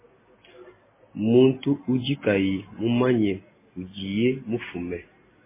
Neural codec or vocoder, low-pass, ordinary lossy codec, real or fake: none; 3.6 kHz; MP3, 16 kbps; real